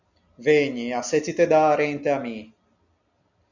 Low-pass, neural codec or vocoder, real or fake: 7.2 kHz; none; real